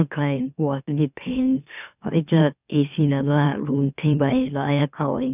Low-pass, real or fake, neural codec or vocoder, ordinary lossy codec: 3.6 kHz; fake; autoencoder, 44.1 kHz, a latent of 192 numbers a frame, MeloTTS; none